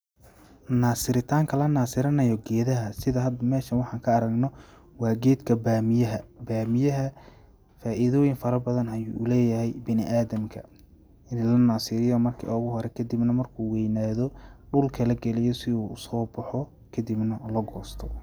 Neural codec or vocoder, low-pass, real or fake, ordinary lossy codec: none; none; real; none